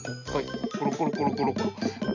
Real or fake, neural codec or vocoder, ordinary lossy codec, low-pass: real; none; MP3, 64 kbps; 7.2 kHz